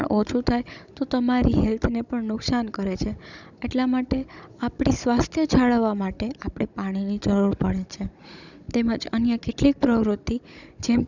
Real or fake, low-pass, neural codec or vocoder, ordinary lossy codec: fake; 7.2 kHz; codec, 16 kHz, 16 kbps, FunCodec, trained on Chinese and English, 50 frames a second; none